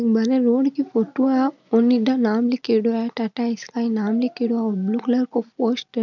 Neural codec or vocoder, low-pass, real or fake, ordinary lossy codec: none; 7.2 kHz; real; none